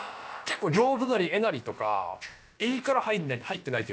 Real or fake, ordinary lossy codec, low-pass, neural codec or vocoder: fake; none; none; codec, 16 kHz, about 1 kbps, DyCAST, with the encoder's durations